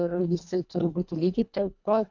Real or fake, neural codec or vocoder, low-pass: fake; codec, 24 kHz, 1.5 kbps, HILCodec; 7.2 kHz